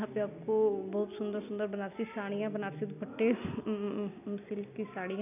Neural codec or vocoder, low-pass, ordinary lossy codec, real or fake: none; 3.6 kHz; none; real